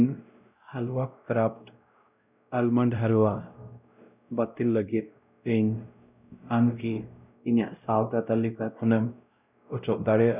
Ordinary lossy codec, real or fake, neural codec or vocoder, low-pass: none; fake; codec, 16 kHz, 0.5 kbps, X-Codec, WavLM features, trained on Multilingual LibriSpeech; 3.6 kHz